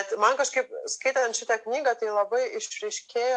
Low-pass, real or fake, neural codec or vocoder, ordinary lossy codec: 10.8 kHz; real; none; AAC, 64 kbps